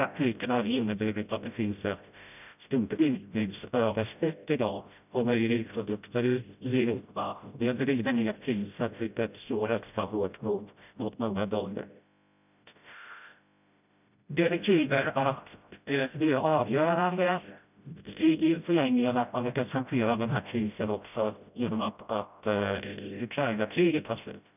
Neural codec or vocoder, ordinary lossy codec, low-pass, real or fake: codec, 16 kHz, 0.5 kbps, FreqCodec, smaller model; none; 3.6 kHz; fake